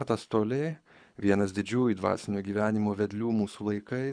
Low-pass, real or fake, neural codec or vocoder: 9.9 kHz; fake; codec, 24 kHz, 6 kbps, HILCodec